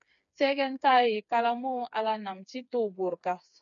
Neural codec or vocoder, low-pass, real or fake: codec, 16 kHz, 4 kbps, FreqCodec, smaller model; 7.2 kHz; fake